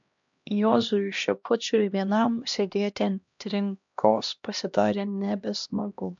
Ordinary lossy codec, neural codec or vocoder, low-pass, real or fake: MP3, 64 kbps; codec, 16 kHz, 1 kbps, X-Codec, HuBERT features, trained on LibriSpeech; 7.2 kHz; fake